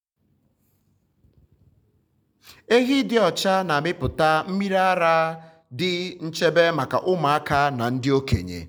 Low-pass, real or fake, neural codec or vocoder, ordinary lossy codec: none; real; none; none